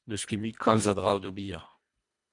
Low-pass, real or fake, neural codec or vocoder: 10.8 kHz; fake; codec, 24 kHz, 1.5 kbps, HILCodec